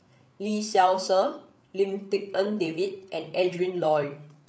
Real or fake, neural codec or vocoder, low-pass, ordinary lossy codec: fake; codec, 16 kHz, 8 kbps, FreqCodec, larger model; none; none